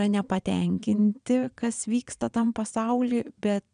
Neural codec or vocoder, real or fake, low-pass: vocoder, 22.05 kHz, 80 mel bands, Vocos; fake; 9.9 kHz